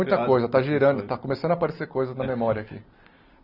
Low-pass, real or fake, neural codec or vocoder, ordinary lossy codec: 5.4 kHz; real; none; none